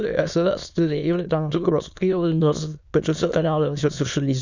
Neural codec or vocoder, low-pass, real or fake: autoencoder, 22.05 kHz, a latent of 192 numbers a frame, VITS, trained on many speakers; 7.2 kHz; fake